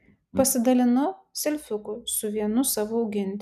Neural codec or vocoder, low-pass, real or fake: none; 14.4 kHz; real